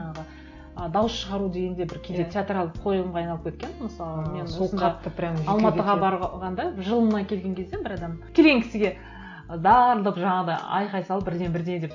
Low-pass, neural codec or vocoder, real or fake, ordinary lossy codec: 7.2 kHz; none; real; AAC, 48 kbps